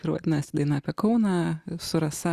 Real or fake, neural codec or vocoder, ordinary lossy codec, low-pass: real; none; Opus, 64 kbps; 14.4 kHz